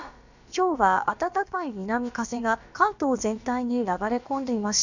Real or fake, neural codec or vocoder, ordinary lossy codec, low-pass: fake; codec, 16 kHz, about 1 kbps, DyCAST, with the encoder's durations; none; 7.2 kHz